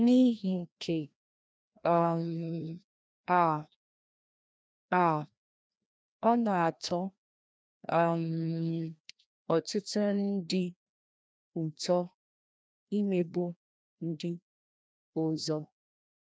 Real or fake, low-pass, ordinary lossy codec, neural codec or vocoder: fake; none; none; codec, 16 kHz, 1 kbps, FreqCodec, larger model